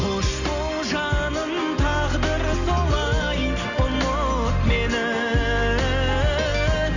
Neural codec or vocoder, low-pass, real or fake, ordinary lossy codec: none; 7.2 kHz; real; none